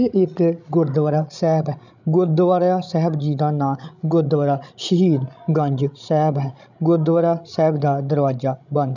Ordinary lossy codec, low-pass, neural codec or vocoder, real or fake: none; 7.2 kHz; codec, 16 kHz, 16 kbps, FreqCodec, larger model; fake